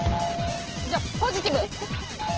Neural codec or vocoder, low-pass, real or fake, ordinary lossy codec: none; 7.2 kHz; real; Opus, 16 kbps